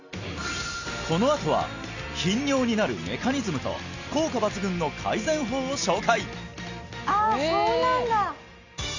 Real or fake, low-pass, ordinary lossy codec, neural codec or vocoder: real; 7.2 kHz; Opus, 64 kbps; none